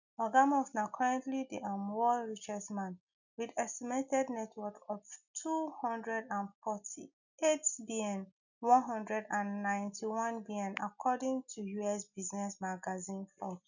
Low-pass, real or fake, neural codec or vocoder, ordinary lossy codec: 7.2 kHz; real; none; none